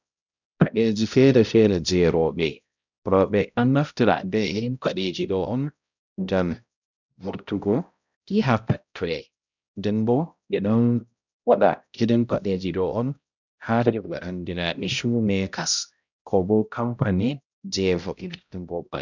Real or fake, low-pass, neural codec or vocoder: fake; 7.2 kHz; codec, 16 kHz, 0.5 kbps, X-Codec, HuBERT features, trained on balanced general audio